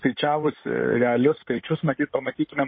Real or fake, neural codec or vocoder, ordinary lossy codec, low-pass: fake; codec, 16 kHz in and 24 kHz out, 2.2 kbps, FireRedTTS-2 codec; MP3, 24 kbps; 7.2 kHz